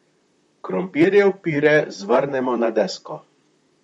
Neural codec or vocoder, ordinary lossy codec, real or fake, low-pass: vocoder, 44.1 kHz, 128 mel bands, Pupu-Vocoder; MP3, 48 kbps; fake; 19.8 kHz